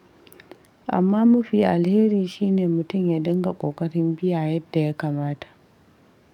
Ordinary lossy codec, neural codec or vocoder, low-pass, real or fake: none; codec, 44.1 kHz, 7.8 kbps, DAC; 19.8 kHz; fake